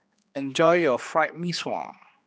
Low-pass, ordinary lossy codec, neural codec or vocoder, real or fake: none; none; codec, 16 kHz, 2 kbps, X-Codec, HuBERT features, trained on general audio; fake